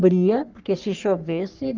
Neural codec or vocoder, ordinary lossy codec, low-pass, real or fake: codec, 44.1 kHz, 3.4 kbps, Pupu-Codec; Opus, 24 kbps; 7.2 kHz; fake